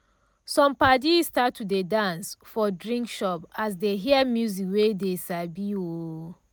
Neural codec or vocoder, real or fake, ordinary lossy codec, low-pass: none; real; none; none